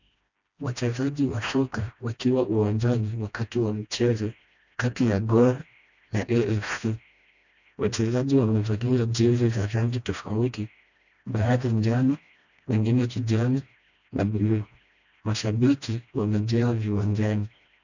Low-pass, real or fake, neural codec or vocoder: 7.2 kHz; fake; codec, 16 kHz, 1 kbps, FreqCodec, smaller model